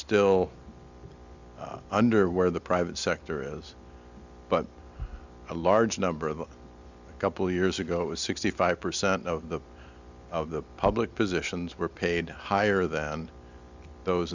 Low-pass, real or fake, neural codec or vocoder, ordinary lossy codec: 7.2 kHz; real; none; Opus, 64 kbps